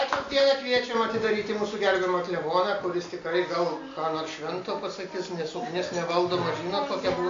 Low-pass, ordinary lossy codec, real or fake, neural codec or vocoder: 7.2 kHz; MP3, 48 kbps; real; none